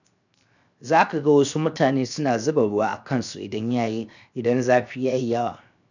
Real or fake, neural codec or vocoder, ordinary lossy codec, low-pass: fake; codec, 16 kHz, 0.7 kbps, FocalCodec; none; 7.2 kHz